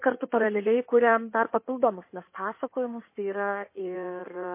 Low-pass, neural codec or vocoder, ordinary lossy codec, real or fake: 3.6 kHz; codec, 16 kHz in and 24 kHz out, 2.2 kbps, FireRedTTS-2 codec; MP3, 24 kbps; fake